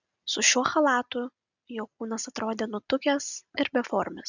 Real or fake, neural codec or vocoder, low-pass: real; none; 7.2 kHz